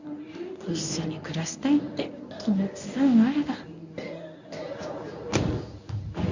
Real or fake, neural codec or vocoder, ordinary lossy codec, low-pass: fake; codec, 24 kHz, 0.9 kbps, WavTokenizer, medium speech release version 1; none; 7.2 kHz